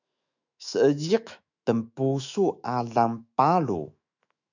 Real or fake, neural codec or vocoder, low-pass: fake; autoencoder, 48 kHz, 128 numbers a frame, DAC-VAE, trained on Japanese speech; 7.2 kHz